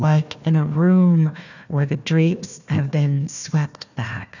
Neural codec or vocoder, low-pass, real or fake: codec, 16 kHz, 1 kbps, FunCodec, trained on Chinese and English, 50 frames a second; 7.2 kHz; fake